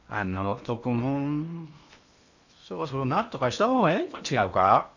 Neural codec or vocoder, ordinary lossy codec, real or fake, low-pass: codec, 16 kHz in and 24 kHz out, 0.8 kbps, FocalCodec, streaming, 65536 codes; none; fake; 7.2 kHz